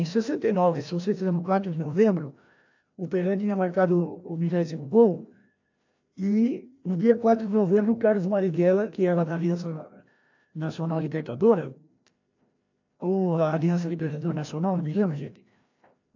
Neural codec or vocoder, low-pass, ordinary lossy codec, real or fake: codec, 16 kHz, 1 kbps, FreqCodec, larger model; 7.2 kHz; AAC, 48 kbps; fake